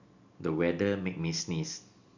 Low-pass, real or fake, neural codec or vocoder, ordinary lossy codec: 7.2 kHz; real; none; none